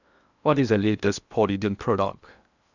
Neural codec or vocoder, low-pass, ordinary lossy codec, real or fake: codec, 16 kHz in and 24 kHz out, 0.8 kbps, FocalCodec, streaming, 65536 codes; 7.2 kHz; none; fake